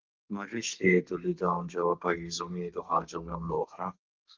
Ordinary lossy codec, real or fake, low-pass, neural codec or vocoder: Opus, 24 kbps; fake; 7.2 kHz; codec, 32 kHz, 1.9 kbps, SNAC